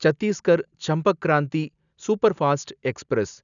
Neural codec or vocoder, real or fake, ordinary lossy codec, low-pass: none; real; none; 7.2 kHz